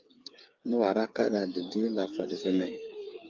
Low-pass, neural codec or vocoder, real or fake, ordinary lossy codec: 7.2 kHz; codec, 16 kHz, 8 kbps, FreqCodec, smaller model; fake; Opus, 24 kbps